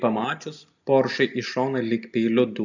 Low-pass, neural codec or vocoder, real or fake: 7.2 kHz; none; real